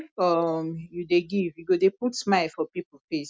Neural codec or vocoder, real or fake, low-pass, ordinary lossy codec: none; real; none; none